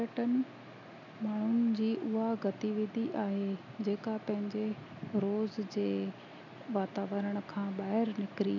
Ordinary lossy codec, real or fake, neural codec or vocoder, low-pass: none; real; none; 7.2 kHz